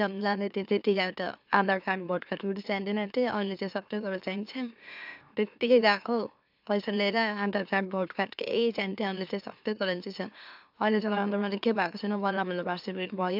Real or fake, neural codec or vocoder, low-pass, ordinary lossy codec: fake; autoencoder, 44.1 kHz, a latent of 192 numbers a frame, MeloTTS; 5.4 kHz; AAC, 48 kbps